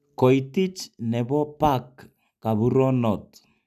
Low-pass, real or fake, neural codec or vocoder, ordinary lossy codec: 14.4 kHz; real; none; none